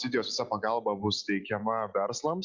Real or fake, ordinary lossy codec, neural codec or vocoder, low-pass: real; Opus, 64 kbps; none; 7.2 kHz